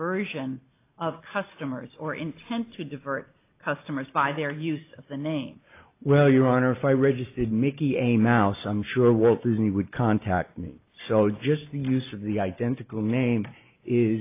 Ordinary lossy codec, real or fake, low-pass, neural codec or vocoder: AAC, 24 kbps; real; 3.6 kHz; none